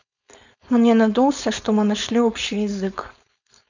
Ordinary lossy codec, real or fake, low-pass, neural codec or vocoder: none; fake; 7.2 kHz; codec, 16 kHz, 4.8 kbps, FACodec